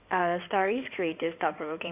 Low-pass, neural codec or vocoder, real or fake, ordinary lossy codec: 3.6 kHz; codec, 16 kHz in and 24 kHz out, 2.2 kbps, FireRedTTS-2 codec; fake; none